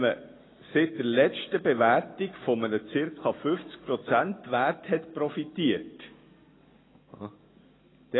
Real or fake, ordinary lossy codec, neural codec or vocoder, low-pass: real; AAC, 16 kbps; none; 7.2 kHz